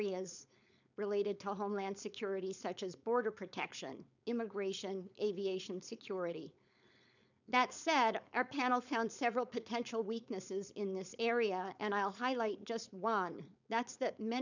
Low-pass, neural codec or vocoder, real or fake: 7.2 kHz; codec, 16 kHz, 4.8 kbps, FACodec; fake